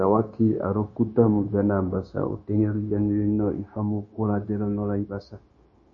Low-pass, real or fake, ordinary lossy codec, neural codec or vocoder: 7.2 kHz; fake; MP3, 32 kbps; codec, 16 kHz, 0.9 kbps, LongCat-Audio-Codec